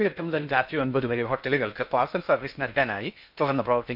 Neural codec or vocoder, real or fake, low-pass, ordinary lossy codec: codec, 16 kHz in and 24 kHz out, 0.6 kbps, FocalCodec, streaming, 2048 codes; fake; 5.4 kHz; none